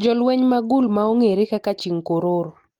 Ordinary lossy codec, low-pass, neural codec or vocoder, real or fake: Opus, 24 kbps; 19.8 kHz; none; real